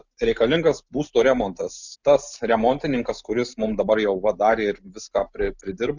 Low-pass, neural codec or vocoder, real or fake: 7.2 kHz; none; real